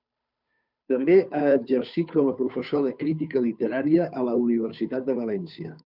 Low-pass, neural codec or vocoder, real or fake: 5.4 kHz; codec, 16 kHz, 2 kbps, FunCodec, trained on Chinese and English, 25 frames a second; fake